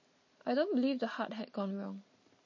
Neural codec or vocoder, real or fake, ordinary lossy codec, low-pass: none; real; MP3, 32 kbps; 7.2 kHz